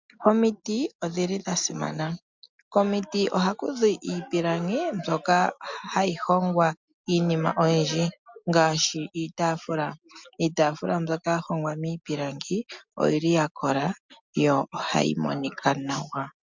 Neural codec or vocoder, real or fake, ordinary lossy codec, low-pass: none; real; MP3, 64 kbps; 7.2 kHz